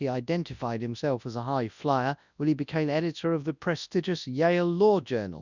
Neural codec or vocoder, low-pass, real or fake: codec, 24 kHz, 0.9 kbps, WavTokenizer, large speech release; 7.2 kHz; fake